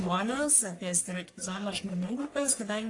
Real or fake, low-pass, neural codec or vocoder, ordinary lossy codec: fake; 10.8 kHz; codec, 44.1 kHz, 1.7 kbps, Pupu-Codec; AAC, 48 kbps